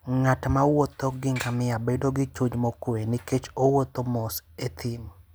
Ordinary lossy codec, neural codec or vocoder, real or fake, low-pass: none; none; real; none